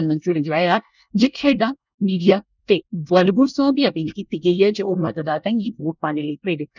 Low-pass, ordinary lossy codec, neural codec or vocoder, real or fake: 7.2 kHz; none; codec, 24 kHz, 1 kbps, SNAC; fake